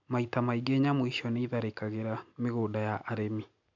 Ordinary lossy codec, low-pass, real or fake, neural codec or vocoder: none; 7.2 kHz; real; none